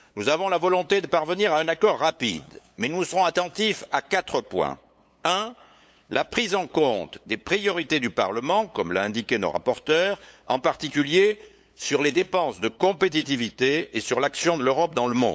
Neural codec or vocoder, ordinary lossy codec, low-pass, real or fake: codec, 16 kHz, 8 kbps, FunCodec, trained on LibriTTS, 25 frames a second; none; none; fake